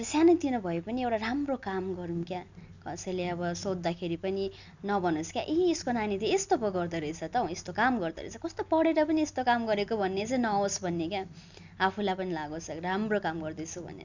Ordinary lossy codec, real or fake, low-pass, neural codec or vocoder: none; real; 7.2 kHz; none